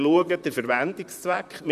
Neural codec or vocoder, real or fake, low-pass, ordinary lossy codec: vocoder, 44.1 kHz, 128 mel bands, Pupu-Vocoder; fake; 14.4 kHz; none